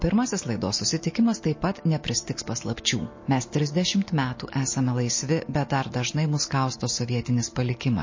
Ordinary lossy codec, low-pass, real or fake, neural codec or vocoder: MP3, 32 kbps; 7.2 kHz; real; none